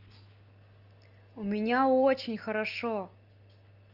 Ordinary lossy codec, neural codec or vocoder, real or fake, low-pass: Opus, 24 kbps; none; real; 5.4 kHz